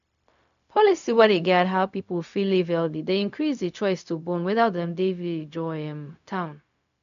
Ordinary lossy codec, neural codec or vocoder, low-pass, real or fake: none; codec, 16 kHz, 0.4 kbps, LongCat-Audio-Codec; 7.2 kHz; fake